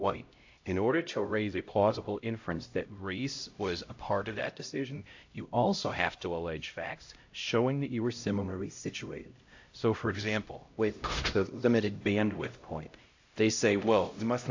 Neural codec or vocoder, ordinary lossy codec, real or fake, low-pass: codec, 16 kHz, 0.5 kbps, X-Codec, HuBERT features, trained on LibriSpeech; AAC, 48 kbps; fake; 7.2 kHz